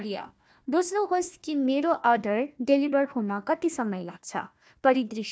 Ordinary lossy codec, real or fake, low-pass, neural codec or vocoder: none; fake; none; codec, 16 kHz, 1 kbps, FunCodec, trained on Chinese and English, 50 frames a second